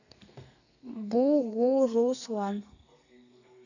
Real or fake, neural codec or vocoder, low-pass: fake; codec, 32 kHz, 1.9 kbps, SNAC; 7.2 kHz